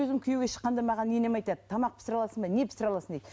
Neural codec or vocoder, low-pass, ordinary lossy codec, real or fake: none; none; none; real